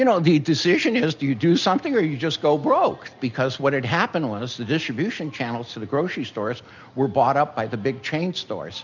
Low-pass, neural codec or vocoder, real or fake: 7.2 kHz; none; real